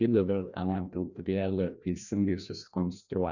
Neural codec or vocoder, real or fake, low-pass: codec, 16 kHz, 1 kbps, FreqCodec, larger model; fake; 7.2 kHz